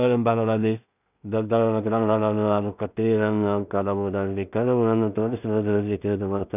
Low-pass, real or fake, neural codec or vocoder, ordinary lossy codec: 3.6 kHz; fake; codec, 16 kHz in and 24 kHz out, 0.4 kbps, LongCat-Audio-Codec, two codebook decoder; none